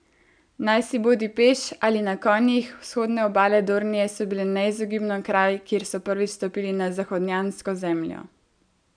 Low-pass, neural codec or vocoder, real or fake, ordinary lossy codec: 9.9 kHz; none; real; none